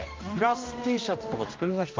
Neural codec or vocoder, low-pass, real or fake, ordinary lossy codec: codec, 16 kHz, 1 kbps, X-Codec, HuBERT features, trained on general audio; 7.2 kHz; fake; Opus, 24 kbps